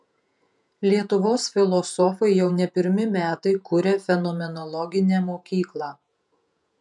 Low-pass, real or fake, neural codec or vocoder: 10.8 kHz; fake; vocoder, 48 kHz, 128 mel bands, Vocos